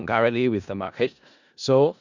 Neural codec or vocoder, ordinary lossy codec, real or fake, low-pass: codec, 16 kHz in and 24 kHz out, 0.4 kbps, LongCat-Audio-Codec, four codebook decoder; none; fake; 7.2 kHz